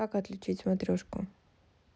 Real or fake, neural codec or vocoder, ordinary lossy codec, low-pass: real; none; none; none